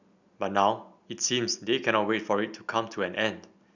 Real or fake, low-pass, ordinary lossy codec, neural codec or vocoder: real; 7.2 kHz; none; none